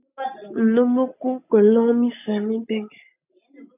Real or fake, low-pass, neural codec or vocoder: real; 3.6 kHz; none